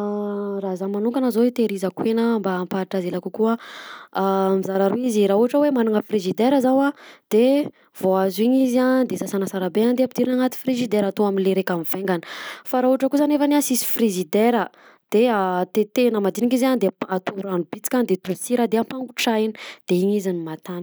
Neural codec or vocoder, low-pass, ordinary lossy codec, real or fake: none; none; none; real